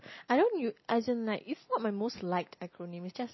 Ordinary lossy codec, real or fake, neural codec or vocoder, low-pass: MP3, 24 kbps; real; none; 7.2 kHz